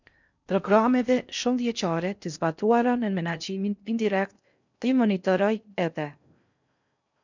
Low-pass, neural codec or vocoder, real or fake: 7.2 kHz; codec, 16 kHz in and 24 kHz out, 0.6 kbps, FocalCodec, streaming, 4096 codes; fake